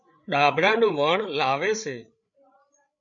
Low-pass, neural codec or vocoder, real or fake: 7.2 kHz; codec, 16 kHz, 8 kbps, FreqCodec, larger model; fake